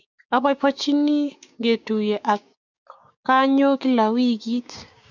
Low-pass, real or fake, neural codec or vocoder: 7.2 kHz; fake; codec, 16 kHz, 6 kbps, DAC